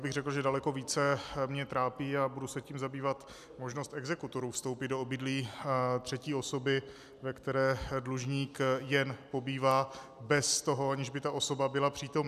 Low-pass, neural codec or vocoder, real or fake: 14.4 kHz; none; real